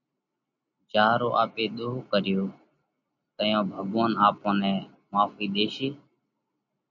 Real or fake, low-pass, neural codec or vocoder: real; 7.2 kHz; none